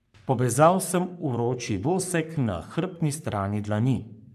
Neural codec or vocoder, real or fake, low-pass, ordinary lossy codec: codec, 44.1 kHz, 3.4 kbps, Pupu-Codec; fake; 14.4 kHz; none